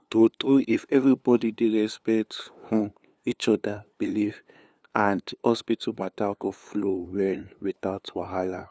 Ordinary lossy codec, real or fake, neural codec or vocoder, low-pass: none; fake; codec, 16 kHz, 2 kbps, FunCodec, trained on LibriTTS, 25 frames a second; none